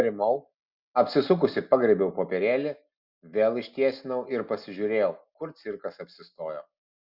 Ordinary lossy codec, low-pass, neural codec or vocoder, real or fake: Opus, 64 kbps; 5.4 kHz; none; real